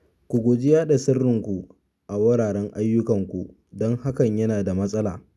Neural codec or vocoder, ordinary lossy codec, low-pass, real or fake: none; none; none; real